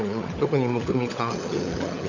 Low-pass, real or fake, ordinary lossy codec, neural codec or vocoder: 7.2 kHz; fake; none; codec, 16 kHz, 16 kbps, FunCodec, trained on LibriTTS, 50 frames a second